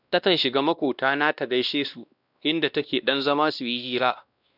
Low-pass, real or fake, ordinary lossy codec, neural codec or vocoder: 5.4 kHz; fake; none; codec, 16 kHz, 1 kbps, X-Codec, WavLM features, trained on Multilingual LibriSpeech